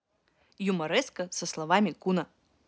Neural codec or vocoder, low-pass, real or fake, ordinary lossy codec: none; none; real; none